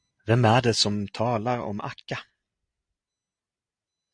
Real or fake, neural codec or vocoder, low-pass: real; none; 9.9 kHz